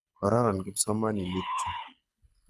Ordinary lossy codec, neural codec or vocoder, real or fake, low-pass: none; codec, 24 kHz, 6 kbps, HILCodec; fake; none